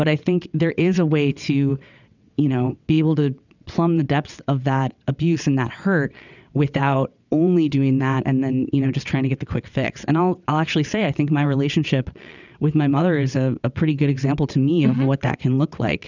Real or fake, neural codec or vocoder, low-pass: fake; vocoder, 22.05 kHz, 80 mel bands, WaveNeXt; 7.2 kHz